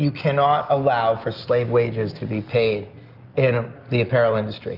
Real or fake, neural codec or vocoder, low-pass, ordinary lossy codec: real; none; 5.4 kHz; Opus, 24 kbps